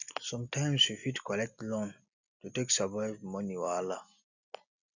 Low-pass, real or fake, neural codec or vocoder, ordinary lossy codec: 7.2 kHz; real; none; none